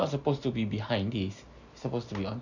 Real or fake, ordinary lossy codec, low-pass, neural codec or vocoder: real; none; 7.2 kHz; none